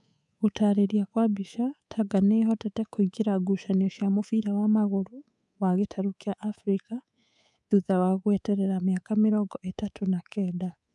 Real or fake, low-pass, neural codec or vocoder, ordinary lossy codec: fake; 10.8 kHz; codec, 24 kHz, 3.1 kbps, DualCodec; none